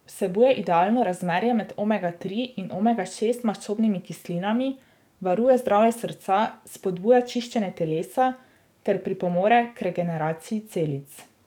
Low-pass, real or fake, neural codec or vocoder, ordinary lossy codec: 19.8 kHz; fake; codec, 44.1 kHz, 7.8 kbps, DAC; none